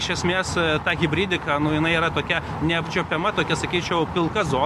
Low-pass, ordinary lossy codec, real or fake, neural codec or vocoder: 14.4 kHz; MP3, 64 kbps; real; none